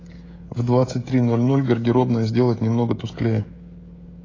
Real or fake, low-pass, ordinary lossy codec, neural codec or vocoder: fake; 7.2 kHz; AAC, 32 kbps; codec, 16 kHz, 16 kbps, FreqCodec, smaller model